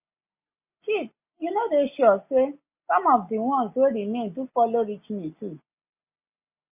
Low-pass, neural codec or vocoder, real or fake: 3.6 kHz; none; real